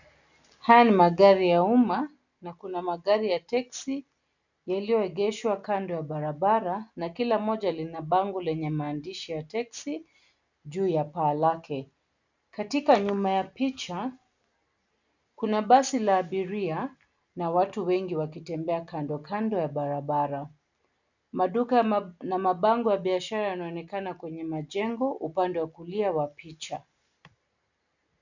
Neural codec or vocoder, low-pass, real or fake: none; 7.2 kHz; real